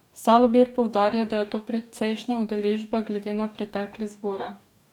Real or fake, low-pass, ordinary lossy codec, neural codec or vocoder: fake; 19.8 kHz; none; codec, 44.1 kHz, 2.6 kbps, DAC